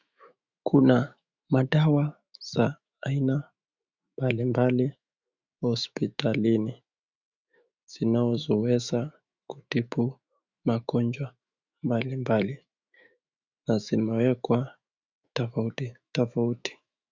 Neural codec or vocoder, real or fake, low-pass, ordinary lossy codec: autoencoder, 48 kHz, 128 numbers a frame, DAC-VAE, trained on Japanese speech; fake; 7.2 kHz; Opus, 64 kbps